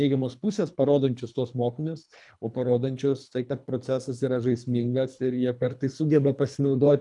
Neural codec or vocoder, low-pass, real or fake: codec, 24 kHz, 3 kbps, HILCodec; 10.8 kHz; fake